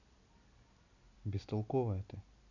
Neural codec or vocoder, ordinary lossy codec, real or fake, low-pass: none; none; real; 7.2 kHz